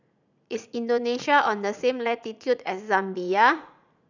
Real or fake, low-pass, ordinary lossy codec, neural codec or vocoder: fake; 7.2 kHz; none; vocoder, 44.1 kHz, 80 mel bands, Vocos